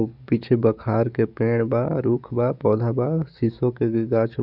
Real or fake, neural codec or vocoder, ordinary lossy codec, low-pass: fake; codec, 44.1 kHz, 7.8 kbps, DAC; none; 5.4 kHz